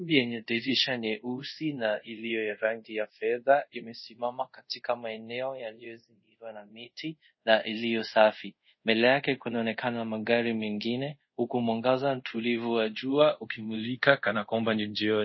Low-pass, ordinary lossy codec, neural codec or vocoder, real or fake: 7.2 kHz; MP3, 24 kbps; codec, 24 kHz, 0.5 kbps, DualCodec; fake